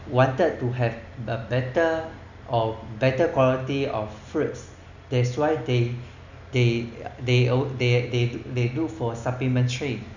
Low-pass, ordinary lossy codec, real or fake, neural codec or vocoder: 7.2 kHz; none; real; none